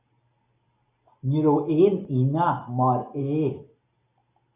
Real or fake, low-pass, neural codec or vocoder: real; 3.6 kHz; none